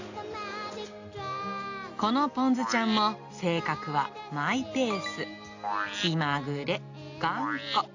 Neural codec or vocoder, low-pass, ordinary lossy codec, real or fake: none; 7.2 kHz; AAC, 48 kbps; real